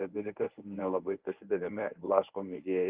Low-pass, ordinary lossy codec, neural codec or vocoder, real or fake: 3.6 kHz; Opus, 24 kbps; codec, 24 kHz, 0.9 kbps, WavTokenizer, medium speech release version 1; fake